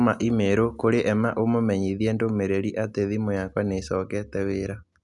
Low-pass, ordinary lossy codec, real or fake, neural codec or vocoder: 10.8 kHz; none; real; none